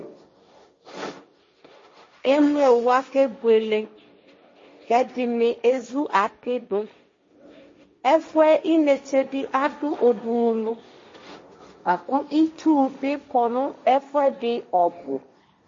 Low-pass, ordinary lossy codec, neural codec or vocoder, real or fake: 7.2 kHz; MP3, 32 kbps; codec, 16 kHz, 1.1 kbps, Voila-Tokenizer; fake